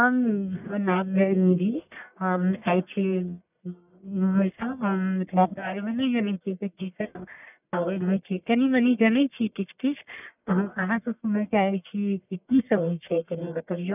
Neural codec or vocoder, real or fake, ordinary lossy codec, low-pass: codec, 44.1 kHz, 1.7 kbps, Pupu-Codec; fake; none; 3.6 kHz